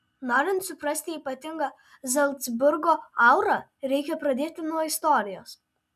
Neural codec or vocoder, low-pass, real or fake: none; 14.4 kHz; real